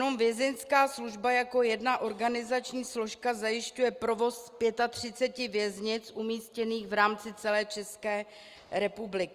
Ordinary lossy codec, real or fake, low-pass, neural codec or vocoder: Opus, 32 kbps; real; 14.4 kHz; none